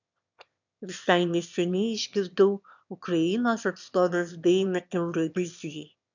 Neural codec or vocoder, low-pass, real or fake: autoencoder, 22.05 kHz, a latent of 192 numbers a frame, VITS, trained on one speaker; 7.2 kHz; fake